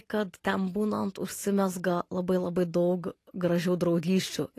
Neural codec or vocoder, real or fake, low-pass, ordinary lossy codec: none; real; 14.4 kHz; AAC, 48 kbps